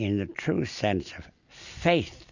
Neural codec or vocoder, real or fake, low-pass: none; real; 7.2 kHz